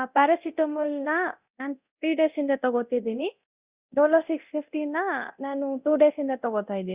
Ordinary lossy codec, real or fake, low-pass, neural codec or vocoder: Opus, 64 kbps; fake; 3.6 kHz; codec, 24 kHz, 0.9 kbps, DualCodec